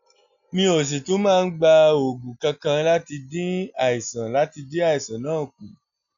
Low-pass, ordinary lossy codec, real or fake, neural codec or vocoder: 7.2 kHz; none; real; none